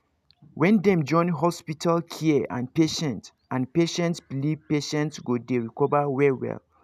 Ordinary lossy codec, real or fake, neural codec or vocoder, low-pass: none; real; none; 14.4 kHz